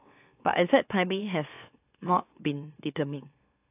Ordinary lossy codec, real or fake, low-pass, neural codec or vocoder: AAC, 24 kbps; fake; 3.6 kHz; autoencoder, 44.1 kHz, a latent of 192 numbers a frame, MeloTTS